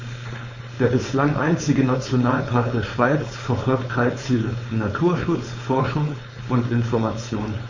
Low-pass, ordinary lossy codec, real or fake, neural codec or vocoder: 7.2 kHz; MP3, 32 kbps; fake; codec, 16 kHz, 4.8 kbps, FACodec